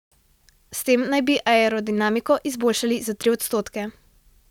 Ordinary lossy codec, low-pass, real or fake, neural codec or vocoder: none; 19.8 kHz; real; none